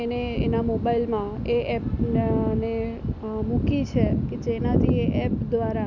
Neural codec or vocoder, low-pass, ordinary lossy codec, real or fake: none; 7.2 kHz; none; real